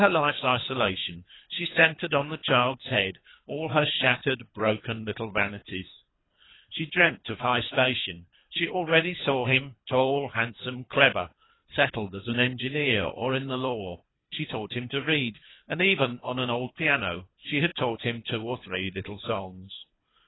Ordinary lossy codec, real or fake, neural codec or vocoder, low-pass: AAC, 16 kbps; fake; codec, 24 kHz, 3 kbps, HILCodec; 7.2 kHz